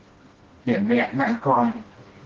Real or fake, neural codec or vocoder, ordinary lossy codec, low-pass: fake; codec, 16 kHz, 1 kbps, FreqCodec, smaller model; Opus, 16 kbps; 7.2 kHz